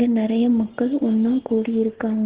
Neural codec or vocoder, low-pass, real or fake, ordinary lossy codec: none; 3.6 kHz; real; Opus, 16 kbps